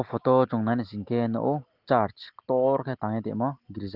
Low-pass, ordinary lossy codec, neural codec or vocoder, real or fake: 5.4 kHz; Opus, 16 kbps; none; real